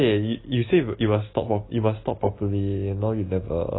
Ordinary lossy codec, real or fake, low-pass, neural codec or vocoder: AAC, 16 kbps; real; 7.2 kHz; none